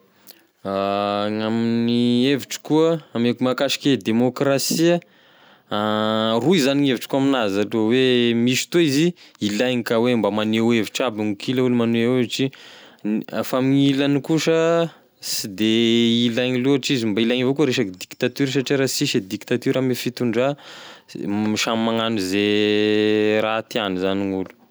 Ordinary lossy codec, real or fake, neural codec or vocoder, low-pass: none; real; none; none